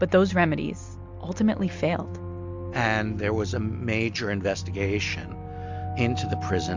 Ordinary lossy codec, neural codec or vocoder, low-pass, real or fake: MP3, 64 kbps; none; 7.2 kHz; real